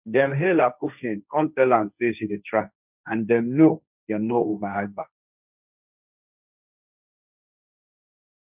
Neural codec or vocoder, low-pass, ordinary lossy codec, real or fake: codec, 16 kHz, 1.1 kbps, Voila-Tokenizer; 3.6 kHz; none; fake